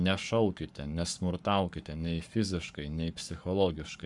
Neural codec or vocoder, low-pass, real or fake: codec, 44.1 kHz, 7.8 kbps, Pupu-Codec; 10.8 kHz; fake